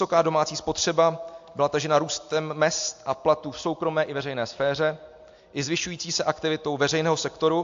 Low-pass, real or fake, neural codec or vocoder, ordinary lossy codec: 7.2 kHz; real; none; AAC, 64 kbps